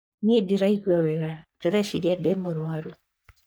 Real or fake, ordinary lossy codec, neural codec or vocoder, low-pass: fake; none; codec, 44.1 kHz, 3.4 kbps, Pupu-Codec; none